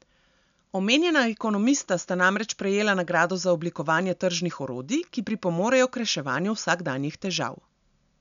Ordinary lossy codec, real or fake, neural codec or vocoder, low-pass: none; real; none; 7.2 kHz